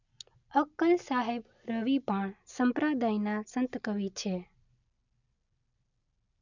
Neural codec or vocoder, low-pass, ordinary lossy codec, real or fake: none; 7.2 kHz; none; real